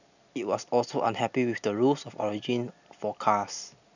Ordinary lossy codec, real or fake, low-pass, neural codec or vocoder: none; real; 7.2 kHz; none